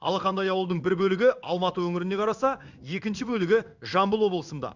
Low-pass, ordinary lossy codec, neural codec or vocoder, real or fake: 7.2 kHz; none; codec, 16 kHz in and 24 kHz out, 1 kbps, XY-Tokenizer; fake